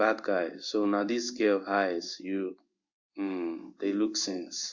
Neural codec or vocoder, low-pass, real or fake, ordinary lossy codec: codec, 16 kHz in and 24 kHz out, 1 kbps, XY-Tokenizer; 7.2 kHz; fake; Opus, 64 kbps